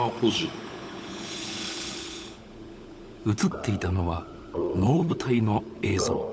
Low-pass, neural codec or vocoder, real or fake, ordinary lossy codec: none; codec, 16 kHz, 16 kbps, FunCodec, trained on LibriTTS, 50 frames a second; fake; none